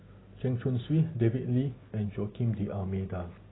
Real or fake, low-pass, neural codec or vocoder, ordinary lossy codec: real; 7.2 kHz; none; AAC, 16 kbps